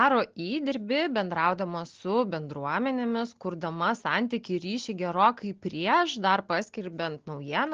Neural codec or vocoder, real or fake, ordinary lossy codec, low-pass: none; real; Opus, 16 kbps; 7.2 kHz